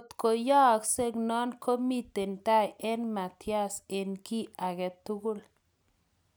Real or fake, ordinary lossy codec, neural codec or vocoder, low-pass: real; none; none; none